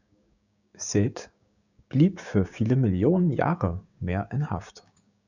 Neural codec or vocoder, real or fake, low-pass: codec, 16 kHz, 6 kbps, DAC; fake; 7.2 kHz